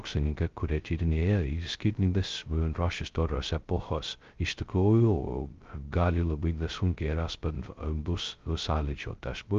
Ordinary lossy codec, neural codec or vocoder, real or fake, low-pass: Opus, 32 kbps; codec, 16 kHz, 0.2 kbps, FocalCodec; fake; 7.2 kHz